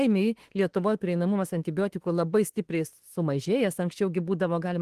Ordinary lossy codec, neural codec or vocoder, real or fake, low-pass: Opus, 16 kbps; autoencoder, 48 kHz, 32 numbers a frame, DAC-VAE, trained on Japanese speech; fake; 14.4 kHz